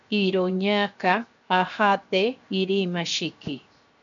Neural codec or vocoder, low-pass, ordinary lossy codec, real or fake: codec, 16 kHz, 0.7 kbps, FocalCodec; 7.2 kHz; MP3, 64 kbps; fake